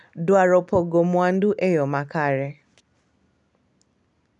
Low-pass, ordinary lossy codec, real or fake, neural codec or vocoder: 10.8 kHz; none; real; none